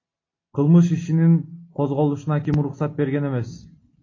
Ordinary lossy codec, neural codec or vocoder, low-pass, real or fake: AAC, 32 kbps; none; 7.2 kHz; real